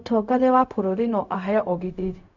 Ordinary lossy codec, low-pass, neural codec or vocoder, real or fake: none; 7.2 kHz; codec, 16 kHz, 0.4 kbps, LongCat-Audio-Codec; fake